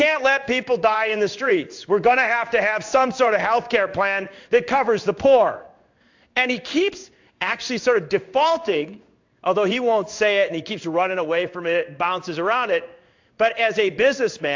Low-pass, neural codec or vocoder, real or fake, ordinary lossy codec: 7.2 kHz; codec, 16 kHz in and 24 kHz out, 1 kbps, XY-Tokenizer; fake; AAC, 48 kbps